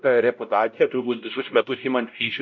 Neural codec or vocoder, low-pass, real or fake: codec, 16 kHz, 0.5 kbps, X-Codec, WavLM features, trained on Multilingual LibriSpeech; 7.2 kHz; fake